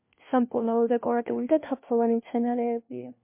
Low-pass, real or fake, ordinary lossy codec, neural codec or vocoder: 3.6 kHz; fake; MP3, 24 kbps; codec, 16 kHz, 1 kbps, FunCodec, trained on LibriTTS, 50 frames a second